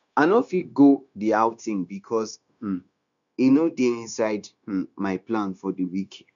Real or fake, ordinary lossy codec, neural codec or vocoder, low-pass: fake; none; codec, 16 kHz, 0.9 kbps, LongCat-Audio-Codec; 7.2 kHz